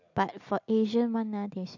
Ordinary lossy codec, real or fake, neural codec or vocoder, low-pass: AAC, 48 kbps; real; none; 7.2 kHz